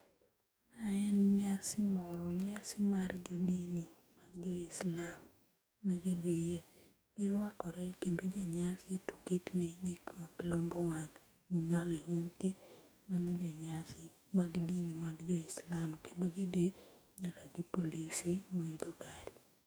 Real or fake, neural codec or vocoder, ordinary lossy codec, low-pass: fake; codec, 44.1 kHz, 2.6 kbps, DAC; none; none